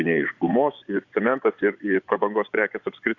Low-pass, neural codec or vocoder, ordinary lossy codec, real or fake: 7.2 kHz; vocoder, 24 kHz, 100 mel bands, Vocos; Opus, 64 kbps; fake